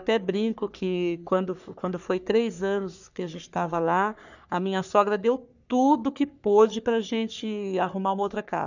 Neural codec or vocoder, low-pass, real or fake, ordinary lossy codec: codec, 44.1 kHz, 3.4 kbps, Pupu-Codec; 7.2 kHz; fake; none